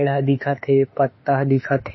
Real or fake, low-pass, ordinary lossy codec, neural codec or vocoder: fake; 7.2 kHz; MP3, 24 kbps; autoencoder, 48 kHz, 32 numbers a frame, DAC-VAE, trained on Japanese speech